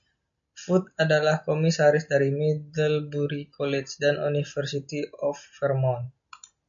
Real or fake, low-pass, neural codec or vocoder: real; 7.2 kHz; none